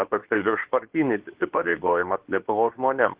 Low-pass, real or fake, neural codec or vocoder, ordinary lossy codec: 3.6 kHz; fake; codec, 24 kHz, 0.9 kbps, WavTokenizer, medium speech release version 2; Opus, 16 kbps